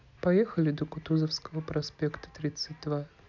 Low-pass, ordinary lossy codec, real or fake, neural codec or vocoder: 7.2 kHz; none; real; none